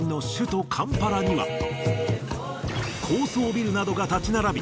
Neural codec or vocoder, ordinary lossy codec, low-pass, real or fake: none; none; none; real